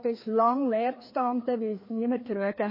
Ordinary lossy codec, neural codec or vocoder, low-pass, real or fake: MP3, 24 kbps; codec, 16 kHz, 2 kbps, FreqCodec, larger model; 5.4 kHz; fake